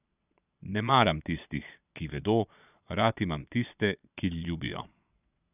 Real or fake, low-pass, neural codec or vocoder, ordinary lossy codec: fake; 3.6 kHz; vocoder, 22.05 kHz, 80 mel bands, Vocos; none